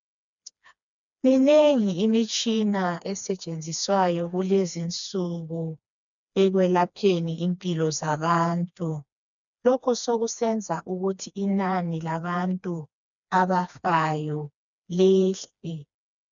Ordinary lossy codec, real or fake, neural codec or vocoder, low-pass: MP3, 96 kbps; fake; codec, 16 kHz, 2 kbps, FreqCodec, smaller model; 7.2 kHz